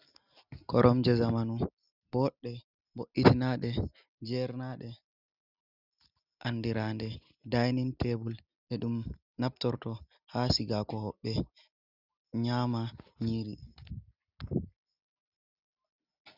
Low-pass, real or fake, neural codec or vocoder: 5.4 kHz; real; none